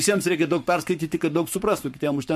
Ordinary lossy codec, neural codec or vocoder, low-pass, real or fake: MP3, 64 kbps; codec, 44.1 kHz, 7.8 kbps, Pupu-Codec; 14.4 kHz; fake